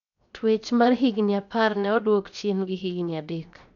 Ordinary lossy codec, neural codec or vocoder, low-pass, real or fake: none; codec, 16 kHz, 0.7 kbps, FocalCodec; 7.2 kHz; fake